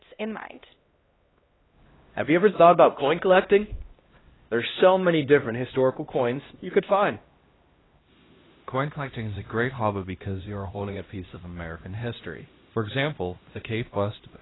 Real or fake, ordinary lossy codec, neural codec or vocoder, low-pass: fake; AAC, 16 kbps; codec, 16 kHz, 1 kbps, X-Codec, HuBERT features, trained on LibriSpeech; 7.2 kHz